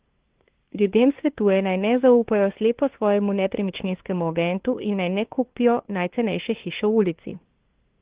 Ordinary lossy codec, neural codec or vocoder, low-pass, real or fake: Opus, 16 kbps; codec, 16 kHz, 2 kbps, FunCodec, trained on LibriTTS, 25 frames a second; 3.6 kHz; fake